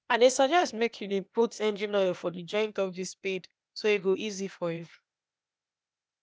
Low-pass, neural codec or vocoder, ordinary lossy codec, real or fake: none; codec, 16 kHz, 0.8 kbps, ZipCodec; none; fake